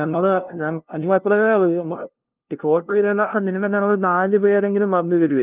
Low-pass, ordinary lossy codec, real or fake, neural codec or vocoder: 3.6 kHz; Opus, 32 kbps; fake; codec, 16 kHz, 0.5 kbps, FunCodec, trained on LibriTTS, 25 frames a second